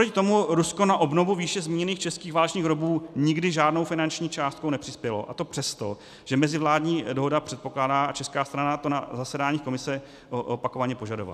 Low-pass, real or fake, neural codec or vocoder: 14.4 kHz; real; none